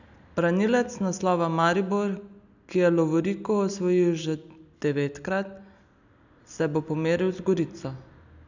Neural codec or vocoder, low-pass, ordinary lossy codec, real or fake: none; 7.2 kHz; none; real